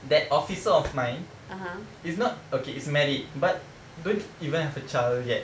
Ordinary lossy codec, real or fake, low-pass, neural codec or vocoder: none; real; none; none